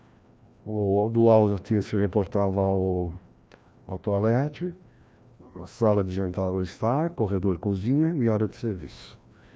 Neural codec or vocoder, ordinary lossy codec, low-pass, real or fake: codec, 16 kHz, 1 kbps, FreqCodec, larger model; none; none; fake